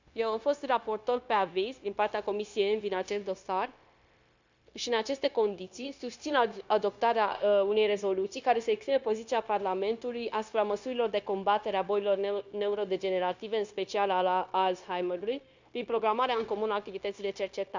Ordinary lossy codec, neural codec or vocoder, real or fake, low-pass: Opus, 64 kbps; codec, 16 kHz, 0.9 kbps, LongCat-Audio-Codec; fake; 7.2 kHz